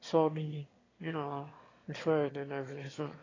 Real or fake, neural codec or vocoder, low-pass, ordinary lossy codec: fake; autoencoder, 22.05 kHz, a latent of 192 numbers a frame, VITS, trained on one speaker; 7.2 kHz; AAC, 32 kbps